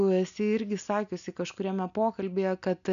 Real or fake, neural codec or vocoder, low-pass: real; none; 7.2 kHz